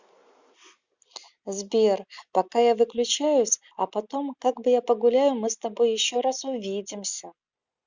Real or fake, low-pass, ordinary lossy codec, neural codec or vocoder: real; 7.2 kHz; Opus, 64 kbps; none